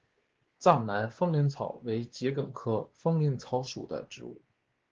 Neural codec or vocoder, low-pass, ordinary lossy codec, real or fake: codec, 16 kHz, 2 kbps, X-Codec, WavLM features, trained on Multilingual LibriSpeech; 7.2 kHz; Opus, 16 kbps; fake